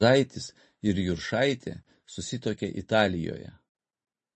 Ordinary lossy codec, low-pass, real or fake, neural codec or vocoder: MP3, 32 kbps; 10.8 kHz; real; none